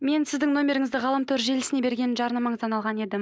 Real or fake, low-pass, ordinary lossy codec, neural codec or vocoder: real; none; none; none